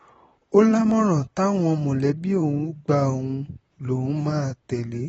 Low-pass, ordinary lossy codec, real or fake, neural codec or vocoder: 19.8 kHz; AAC, 24 kbps; fake; vocoder, 44.1 kHz, 128 mel bands, Pupu-Vocoder